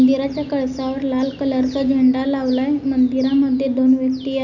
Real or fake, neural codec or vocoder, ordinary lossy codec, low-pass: real; none; none; 7.2 kHz